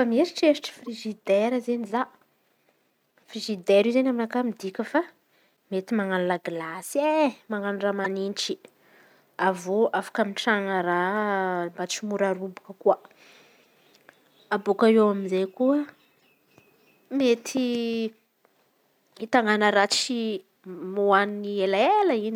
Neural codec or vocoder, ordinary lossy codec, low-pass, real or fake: none; none; 19.8 kHz; real